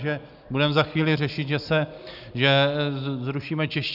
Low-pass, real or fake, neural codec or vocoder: 5.4 kHz; real; none